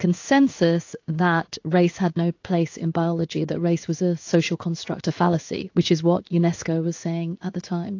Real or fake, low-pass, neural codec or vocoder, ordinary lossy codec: fake; 7.2 kHz; codec, 16 kHz in and 24 kHz out, 1 kbps, XY-Tokenizer; AAC, 48 kbps